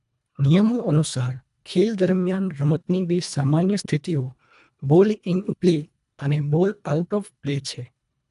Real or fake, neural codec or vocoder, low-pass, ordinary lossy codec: fake; codec, 24 kHz, 1.5 kbps, HILCodec; 10.8 kHz; none